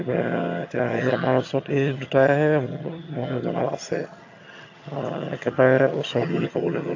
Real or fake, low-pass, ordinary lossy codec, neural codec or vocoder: fake; 7.2 kHz; AAC, 48 kbps; vocoder, 22.05 kHz, 80 mel bands, HiFi-GAN